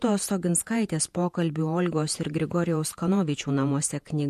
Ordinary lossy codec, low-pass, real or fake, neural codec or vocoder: MP3, 64 kbps; 14.4 kHz; fake; vocoder, 48 kHz, 128 mel bands, Vocos